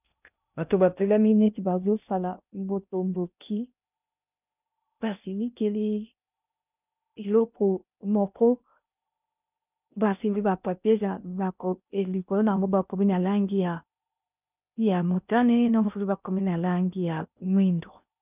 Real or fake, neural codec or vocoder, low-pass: fake; codec, 16 kHz in and 24 kHz out, 0.6 kbps, FocalCodec, streaming, 2048 codes; 3.6 kHz